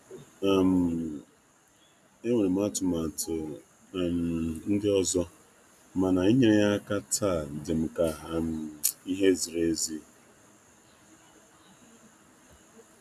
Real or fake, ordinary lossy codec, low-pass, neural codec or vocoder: real; none; 14.4 kHz; none